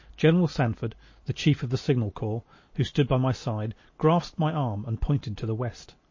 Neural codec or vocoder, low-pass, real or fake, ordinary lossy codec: none; 7.2 kHz; real; MP3, 32 kbps